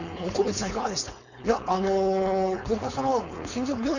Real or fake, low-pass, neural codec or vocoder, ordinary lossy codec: fake; 7.2 kHz; codec, 16 kHz, 4.8 kbps, FACodec; none